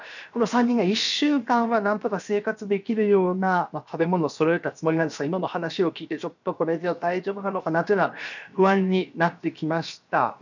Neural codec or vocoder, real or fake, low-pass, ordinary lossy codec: codec, 16 kHz, 0.7 kbps, FocalCodec; fake; 7.2 kHz; none